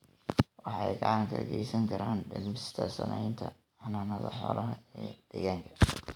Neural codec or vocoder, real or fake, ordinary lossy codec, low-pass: vocoder, 44.1 kHz, 128 mel bands every 512 samples, BigVGAN v2; fake; none; 19.8 kHz